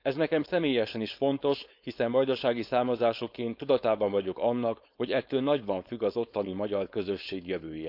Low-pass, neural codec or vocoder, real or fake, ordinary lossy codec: 5.4 kHz; codec, 16 kHz, 4.8 kbps, FACodec; fake; none